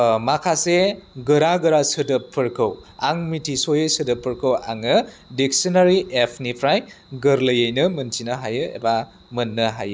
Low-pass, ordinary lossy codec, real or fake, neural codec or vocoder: none; none; real; none